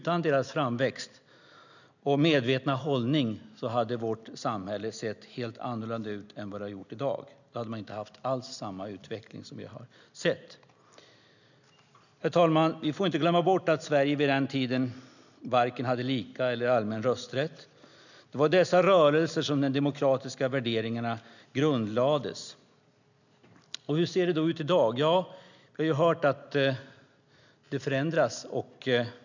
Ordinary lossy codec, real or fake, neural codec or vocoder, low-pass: none; real; none; 7.2 kHz